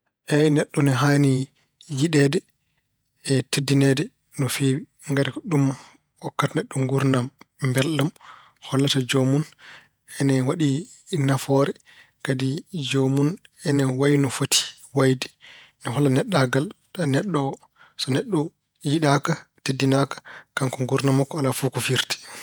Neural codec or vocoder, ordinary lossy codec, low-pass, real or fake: vocoder, 48 kHz, 128 mel bands, Vocos; none; none; fake